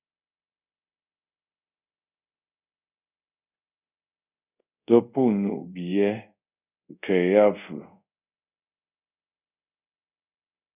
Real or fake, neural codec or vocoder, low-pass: fake; codec, 24 kHz, 0.5 kbps, DualCodec; 3.6 kHz